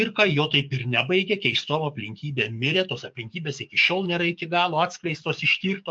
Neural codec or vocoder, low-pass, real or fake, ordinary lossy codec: codec, 44.1 kHz, 7.8 kbps, DAC; 9.9 kHz; fake; MP3, 64 kbps